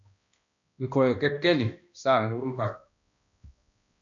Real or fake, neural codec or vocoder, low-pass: fake; codec, 16 kHz, 1 kbps, X-Codec, HuBERT features, trained on balanced general audio; 7.2 kHz